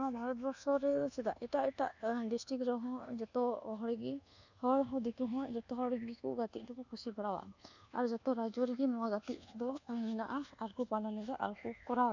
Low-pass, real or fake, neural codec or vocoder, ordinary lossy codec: 7.2 kHz; fake; codec, 24 kHz, 1.2 kbps, DualCodec; none